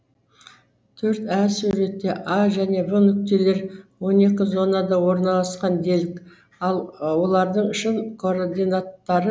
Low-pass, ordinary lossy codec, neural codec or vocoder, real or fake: none; none; none; real